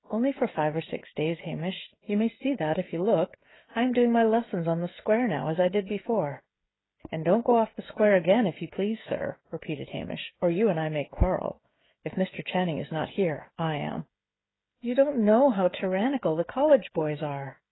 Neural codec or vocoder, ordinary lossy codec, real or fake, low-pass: codec, 16 kHz, 16 kbps, FreqCodec, smaller model; AAC, 16 kbps; fake; 7.2 kHz